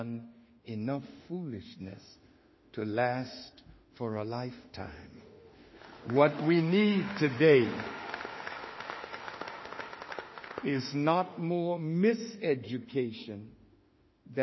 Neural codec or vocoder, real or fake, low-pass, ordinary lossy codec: autoencoder, 48 kHz, 32 numbers a frame, DAC-VAE, trained on Japanese speech; fake; 7.2 kHz; MP3, 24 kbps